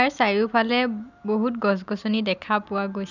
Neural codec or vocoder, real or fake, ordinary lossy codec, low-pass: none; real; none; 7.2 kHz